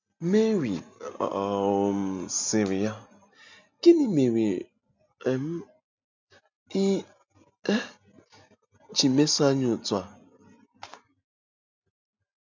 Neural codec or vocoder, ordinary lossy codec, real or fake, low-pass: none; MP3, 64 kbps; real; 7.2 kHz